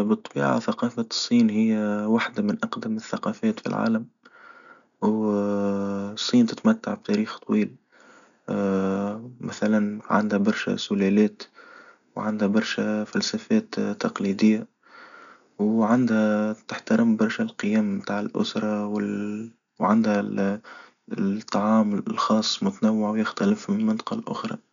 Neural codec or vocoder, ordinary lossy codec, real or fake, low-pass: none; none; real; 7.2 kHz